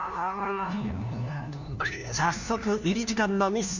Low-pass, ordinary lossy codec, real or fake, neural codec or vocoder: 7.2 kHz; none; fake; codec, 16 kHz, 1 kbps, FunCodec, trained on LibriTTS, 50 frames a second